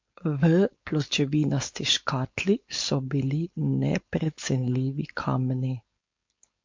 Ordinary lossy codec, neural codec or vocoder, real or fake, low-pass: MP3, 48 kbps; codec, 16 kHz, 4.8 kbps, FACodec; fake; 7.2 kHz